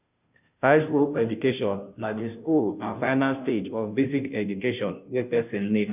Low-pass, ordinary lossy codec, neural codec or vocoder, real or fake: 3.6 kHz; none; codec, 16 kHz, 0.5 kbps, FunCodec, trained on Chinese and English, 25 frames a second; fake